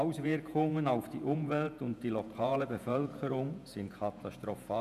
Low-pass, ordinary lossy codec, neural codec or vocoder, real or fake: 14.4 kHz; none; vocoder, 48 kHz, 128 mel bands, Vocos; fake